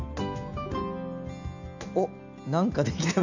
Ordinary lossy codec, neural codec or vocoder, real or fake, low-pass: none; none; real; 7.2 kHz